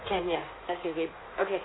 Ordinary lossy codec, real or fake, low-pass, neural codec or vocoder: AAC, 16 kbps; fake; 7.2 kHz; codec, 16 kHz in and 24 kHz out, 1.1 kbps, FireRedTTS-2 codec